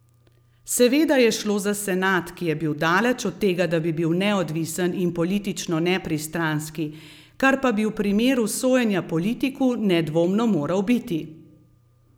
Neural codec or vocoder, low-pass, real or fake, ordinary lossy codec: none; none; real; none